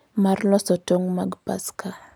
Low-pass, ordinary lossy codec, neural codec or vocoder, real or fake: none; none; none; real